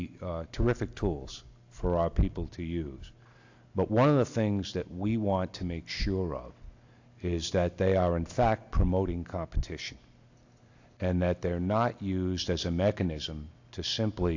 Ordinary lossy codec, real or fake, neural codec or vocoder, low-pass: AAC, 48 kbps; real; none; 7.2 kHz